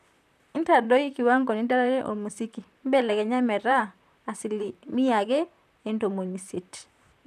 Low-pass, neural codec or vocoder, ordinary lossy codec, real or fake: 14.4 kHz; vocoder, 44.1 kHz, 128 mel bands, Pupu-Vocoder; none; fake